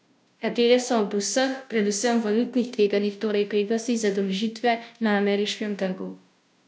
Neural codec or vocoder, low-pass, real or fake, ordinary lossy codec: codec, 16 kHz, 0.5 kbps, FunCodec, trained on Chinese and English, 25 frames a second; none; fake; none